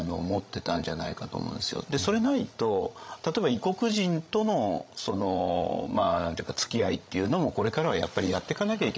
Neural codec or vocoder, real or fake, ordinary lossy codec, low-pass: codec, 16 kHz, 16 kbps, FreqCodec, larger model; fake; none; none